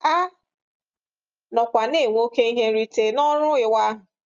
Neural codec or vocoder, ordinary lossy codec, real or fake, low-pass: none; Opus, 32 kbps; real; 7.2 kHz